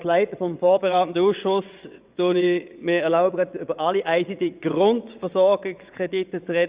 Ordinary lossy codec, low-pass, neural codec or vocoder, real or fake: Opus, 64 kbps; 3.6 kHz; vocoder, 22.05 kHz, 80 mel bands, WaveNeXt; fake